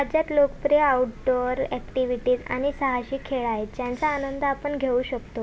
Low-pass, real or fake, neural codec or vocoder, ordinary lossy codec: none; real; none; none